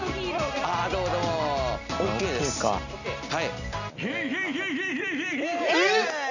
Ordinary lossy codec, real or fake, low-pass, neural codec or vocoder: none; real; 7.2 kHz; none